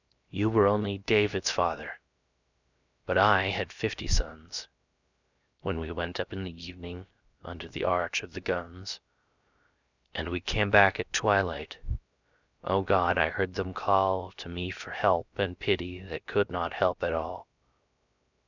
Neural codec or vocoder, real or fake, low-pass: codec, 16 kHz, 0.7 kbps, FocalCodec; fake; 7.2 kHz